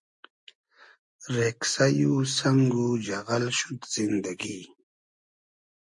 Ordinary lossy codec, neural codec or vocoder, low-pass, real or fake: MP3, 48 kbps; none; 10.8 kHz; real